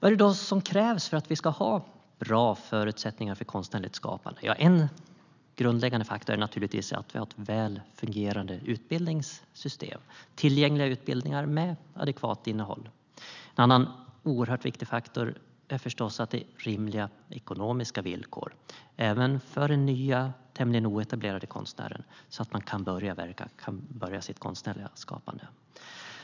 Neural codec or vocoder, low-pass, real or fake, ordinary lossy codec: none; 7.2 kHz; real; none